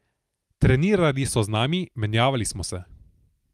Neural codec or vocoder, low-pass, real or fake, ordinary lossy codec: none; 14.4 kHz; real; Opus, 32 kbps